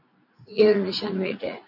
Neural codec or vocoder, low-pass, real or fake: vocoder, 44.1 kHz, 80 mel bands, Vocos; 5.4 kHz; fake